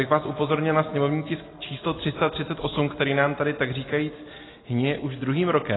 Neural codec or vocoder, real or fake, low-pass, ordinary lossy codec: none; real; 7.2 kHz; AAC, 16 kbps